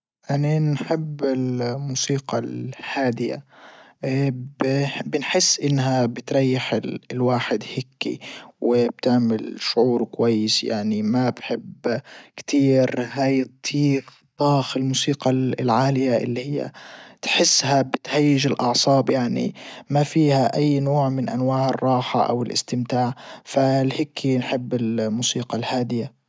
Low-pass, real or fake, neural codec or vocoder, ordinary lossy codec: none; real; none; none